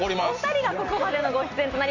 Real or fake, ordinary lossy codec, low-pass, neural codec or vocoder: real; none; 7.2 kHz; none